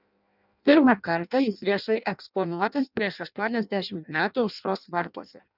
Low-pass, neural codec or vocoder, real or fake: 5.4 kHz; codec, 16 kHz in and 24 kHz out, 0.6 kbps, FireRedTTS-2 codec; fake